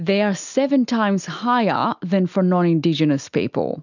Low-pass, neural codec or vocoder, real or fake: 7.2 kHz; none; real